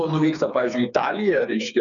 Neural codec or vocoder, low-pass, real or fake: codec, 16 kHz, 4 kbps, FreqCodec, smaller model; 7.2 kHz; fake